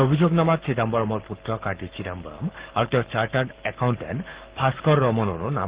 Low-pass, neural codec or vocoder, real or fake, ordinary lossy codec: 3.6 kHz; codec, 44.1 kHz, 7.8 kbps, Pupu-Codec; fake; Opus, 16 kbps